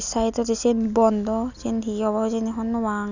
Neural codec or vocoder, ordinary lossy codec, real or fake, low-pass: none; none; real; 7.2 kHz